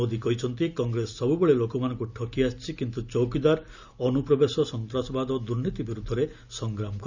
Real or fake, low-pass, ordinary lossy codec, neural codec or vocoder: real; 7.2 kHz; none; none